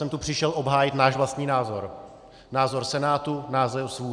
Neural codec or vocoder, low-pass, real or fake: none; 9.9 kHz; real